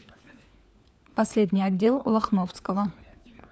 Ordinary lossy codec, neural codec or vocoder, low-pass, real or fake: none; codec, 16 kHz, 4 kbps, FunCodec, trained on LibriTTS, 50 frames a second; none; fake